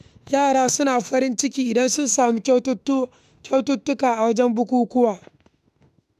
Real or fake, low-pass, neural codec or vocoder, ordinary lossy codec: fake; 14.4 kHz; autoencoder, 48 kHz, 32 numbers a frame, DAC-VAE, trained on Japanese speech; none